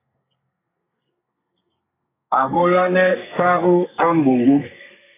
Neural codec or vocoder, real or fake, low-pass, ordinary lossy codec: codec, 32 kHz, 1.9 kbps, SNAC; fake; 3.6 kHz; AAC, 16 kbps